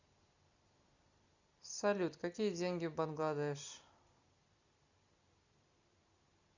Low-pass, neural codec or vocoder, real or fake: 7.2 kHz; none; real